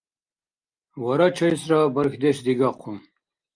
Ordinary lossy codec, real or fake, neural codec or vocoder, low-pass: Opus, 32 kbps; real; none; 9.9 kHz